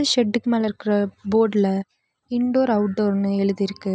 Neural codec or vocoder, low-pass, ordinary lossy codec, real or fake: none; none; none; real